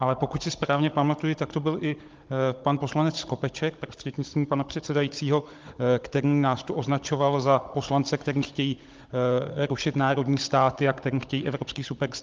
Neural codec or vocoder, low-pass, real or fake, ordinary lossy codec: codec, 16 kHz, 16 kbps, FunCodec, trained on Chinese and English, 50 frames a second; 7.2 kHz; fake; Opus, 24 kbps